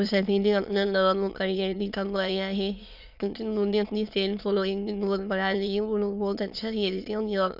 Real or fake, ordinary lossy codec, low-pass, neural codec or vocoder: fake; none; 5.4 kHz; autoencoder, 22.05 kHz, a latent of 192 numbers a frame, VITS, trained on many speakers